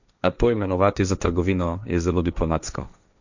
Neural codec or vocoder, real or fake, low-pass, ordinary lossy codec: codec, 16 kHz, 1.1 kbps, Voila-Tokenizer; fake; 7.2 kHz; none